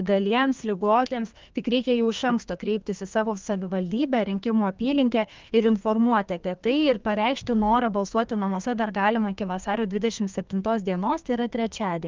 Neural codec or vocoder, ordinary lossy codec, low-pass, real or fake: codec, 32 kHz, 1.9 kbps, SNAC; Opus, 32 kbps; 7.2 kHz; fake